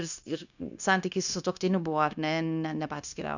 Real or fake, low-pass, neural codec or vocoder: fake; 7.2 kHz; codec, 16 kHz, 0.9 kbps, LongCat-Audio-Codec